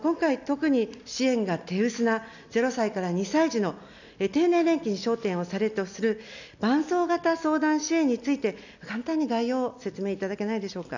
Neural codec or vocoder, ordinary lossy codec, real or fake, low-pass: none; none; real; 7.2 kHz